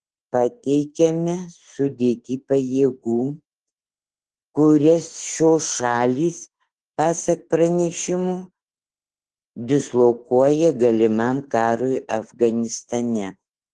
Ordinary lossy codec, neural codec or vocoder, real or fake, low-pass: Opus, 16 kbps; autoencoder, 48 kHz, 32 numbers a frame, DAC-VAE, trained on Japanese speech; fake; 10.8 kHz